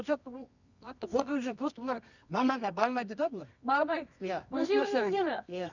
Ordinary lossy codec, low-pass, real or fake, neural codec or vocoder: none; 7.2 kHz; fake; codec, 24 kHz, 0.9 kbps, WavTokenizer, medium music audio release